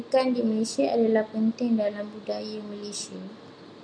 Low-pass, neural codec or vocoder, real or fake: 9.9 kHz; none; real